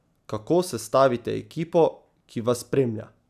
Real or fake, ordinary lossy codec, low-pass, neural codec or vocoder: real; none; 14.4 kHz; none